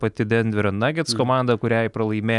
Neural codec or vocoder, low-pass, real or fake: none; 10.8 kHz; real